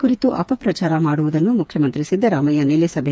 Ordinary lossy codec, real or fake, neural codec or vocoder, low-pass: none; fake; codec, 16 kHz, 4 kbps, FreqCodec, smaller model; none